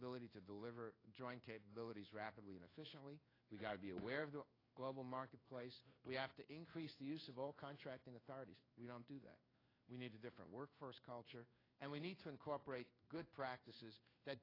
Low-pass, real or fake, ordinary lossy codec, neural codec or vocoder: 5.4 kHz; fake; AAC, 24 kbps; codec, 16 kHz in and 24 kHz out, 1 kbps, XY-Tokenizer